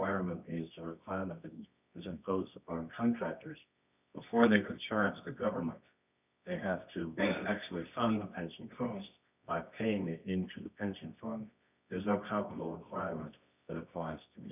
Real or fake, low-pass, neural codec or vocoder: fake; 3.6 kHz; codec, 24 kHz, 0.9 kbps, WavTokenizer, medium music audio release